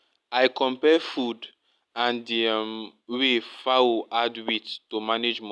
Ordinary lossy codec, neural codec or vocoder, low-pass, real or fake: none; none; none; real